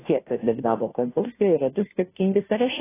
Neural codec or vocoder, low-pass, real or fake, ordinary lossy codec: codec, 16 kHz, 0.5 kbps, FunCodec, trained on Chinese and English, 25 frames a second; 3.6 kHz; fake; AAC, 16 kbps